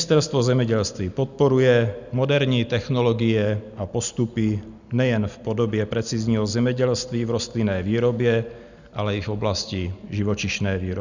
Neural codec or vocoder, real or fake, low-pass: none; real; 7.2 kHz